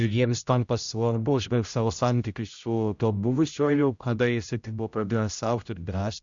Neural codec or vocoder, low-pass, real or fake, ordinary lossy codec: codec, 16 kHz, 0.5 kbps, X-Codec, HuBERT features, trained on general audio; 7.2 kHz; fake; Opus, 64 kbps